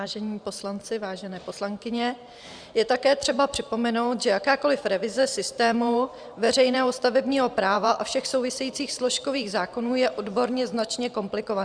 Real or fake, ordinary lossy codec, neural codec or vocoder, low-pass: fake; Opus, 64 kbps; vocoder, 44.1 kHz, 128 mel bands every 512 samples, BigVGAN v2; 9.9 kHz